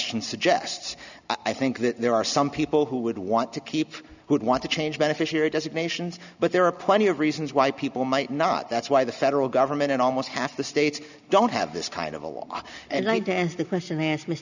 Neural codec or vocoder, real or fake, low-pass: none; real; 7.2 kHz